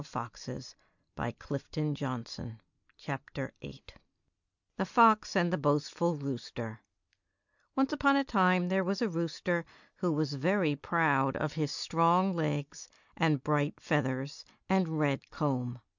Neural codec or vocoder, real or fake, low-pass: none; real; 7.2 kHz